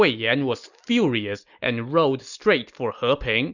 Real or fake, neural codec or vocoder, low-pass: real; none; 7.2 kHz